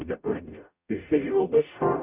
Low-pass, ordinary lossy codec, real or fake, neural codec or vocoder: 3.6 kHz; Opus, 64 kbps; fake; codec, 44.1 kHz, 0.9 kbps, DAC